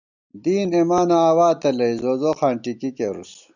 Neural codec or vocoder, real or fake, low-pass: none; real; 7.2 kHz